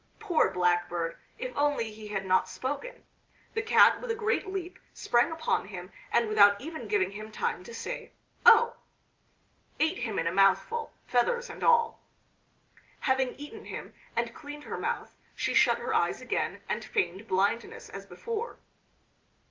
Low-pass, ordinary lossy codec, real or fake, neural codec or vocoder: 7.2 kHz; Opus, 24 kbps; real; none